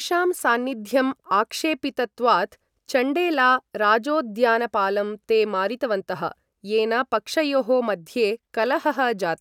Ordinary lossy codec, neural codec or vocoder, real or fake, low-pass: none; none; real; 19.8 kHz